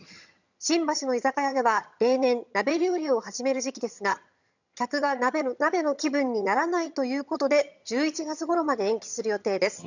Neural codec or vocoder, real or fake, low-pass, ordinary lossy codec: vocoder, 22.05 kHz, 80 mel bands, HiFi-GAN; fake; 7.2 kHz; none